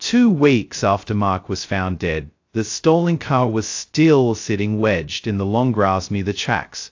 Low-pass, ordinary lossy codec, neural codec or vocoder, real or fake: 7.2 kHz; AAC, 48 kbps; codec, 16 kHz, 0.2 kbps, FocalCodec; fake